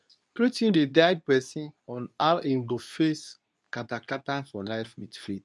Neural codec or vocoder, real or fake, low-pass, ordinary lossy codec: codec, 24 kHz, 0.9 kbps, WavTokenizer, medium speech release version 2; fake; none; none